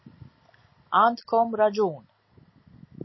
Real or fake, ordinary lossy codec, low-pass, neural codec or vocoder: real; MP3, 24 kbps; 7.2 kHz; none